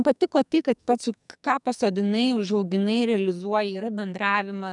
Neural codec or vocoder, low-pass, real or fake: codec, 44.1 kHz, 2.6 kbps, SNAC; 10.8 kHz; fake